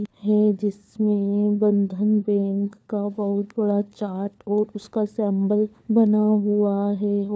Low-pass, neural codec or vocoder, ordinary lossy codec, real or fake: none; codec, 16 kHz, 4 kbps, FunCodec, trained on LibriTTS, 50 frames a second; none; fake